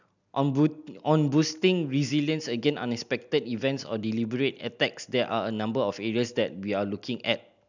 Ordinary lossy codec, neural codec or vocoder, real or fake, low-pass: none; none; real; 7.2 kHz